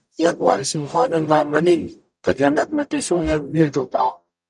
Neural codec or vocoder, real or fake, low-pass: codec, 44.1 kHz, 0.9 kbps, DAC; fake; 10.8 kHz